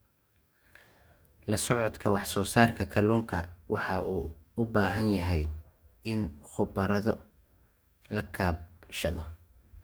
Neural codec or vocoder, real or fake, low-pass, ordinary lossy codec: codec, 44.1 kHz, 2.6 kbps, DAC; fake; none; none